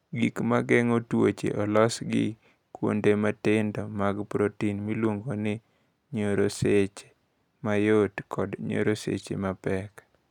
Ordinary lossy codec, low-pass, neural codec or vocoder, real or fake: none; 19.8 kHz; none; real